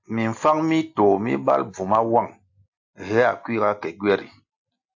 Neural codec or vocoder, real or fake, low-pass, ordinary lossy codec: none; real; 7.2 kHz; AAC, 48 kbps